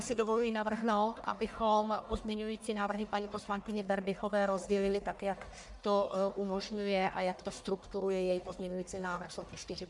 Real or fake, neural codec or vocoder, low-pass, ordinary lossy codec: fake; codec, 44.1 kHz, 1.7 kbps, Pupu-Codec; 10.8 kHz; MP3, 96 kbps